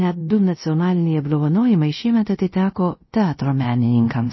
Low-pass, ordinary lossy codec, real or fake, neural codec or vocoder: 7.2 kHz; MP3, 24 kbps; fake; codec, 24 kHz, 0.5 kbps, DualCodec